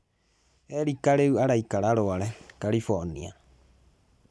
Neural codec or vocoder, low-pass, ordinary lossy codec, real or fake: none; none; none; real